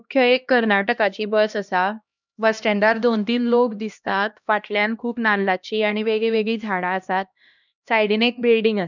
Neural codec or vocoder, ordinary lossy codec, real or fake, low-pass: codec, 16 kHz, 1 kbps, X-Codec, HuBERT features, trained on LibriSpeech; none; fake; 7.2 kHz